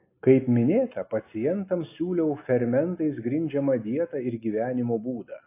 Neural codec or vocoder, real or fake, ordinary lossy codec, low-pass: none; real; AAC, 24 kbps; 3.6 kHz